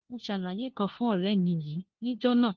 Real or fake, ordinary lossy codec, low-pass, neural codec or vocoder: fake; Opus, 16 kbps; 7.2 kHz; codec, 16 kHz, 1 kbps, FunCodec, trained on LibriTTS, 50 frames a second